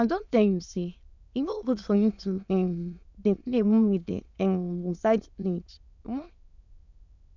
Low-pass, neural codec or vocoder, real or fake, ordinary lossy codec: 7.2 kHz; autoencoder, 22.05 kHz, a latent of 192 numbers a frame, VITS, trained on many speakers; fake; none